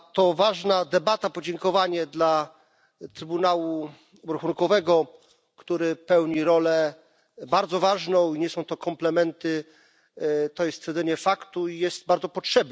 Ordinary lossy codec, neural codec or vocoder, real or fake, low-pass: none; none; real; none